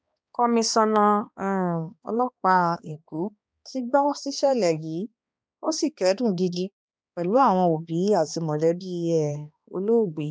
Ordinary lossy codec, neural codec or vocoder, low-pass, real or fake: none; codec, 16 kHz, 2 kbps, X-Codec, HuBERT features, trained on balanced general audio; none; fake